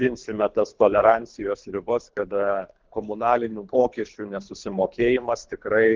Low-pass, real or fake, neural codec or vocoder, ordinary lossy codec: 7.2 kHz; fake; codec, 24 kHz, 3 kbps, HILCodec; Opus, 32 kbps